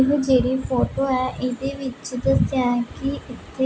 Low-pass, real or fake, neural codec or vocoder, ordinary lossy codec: none; real; none; none